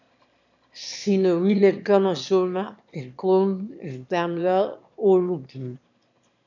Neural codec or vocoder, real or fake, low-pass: autoencoder, 22.05 kHz, a latent of 192 numbers a frame, VITS, trained on one speaker; fake; 7.2 kHz